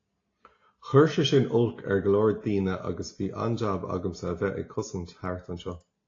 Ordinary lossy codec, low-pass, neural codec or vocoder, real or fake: AAC, 32 kbps; 7.2 kHz; none; real